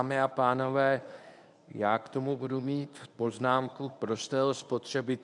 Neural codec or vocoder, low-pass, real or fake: codec, 24 kHz, 0.9 kbps, WavTokenizer, medium speech release version 1; 10.8 kHz; fake